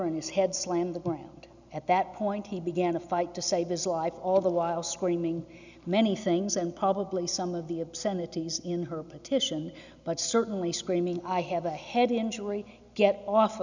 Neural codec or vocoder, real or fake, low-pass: none; real; 7.2 kHz